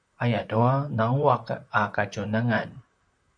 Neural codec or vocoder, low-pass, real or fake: vocoder, 44.1 kHz, 128 mel bands, Pupu-Vocoder; 9.9 kHz; fake